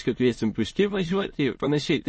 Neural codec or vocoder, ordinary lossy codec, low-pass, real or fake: autoencoder, 22.05 kHz, a latent of 192 numbers a frame, VITS, trained on many speakers; MP3, 32 kbps; 9.9 kHz; fake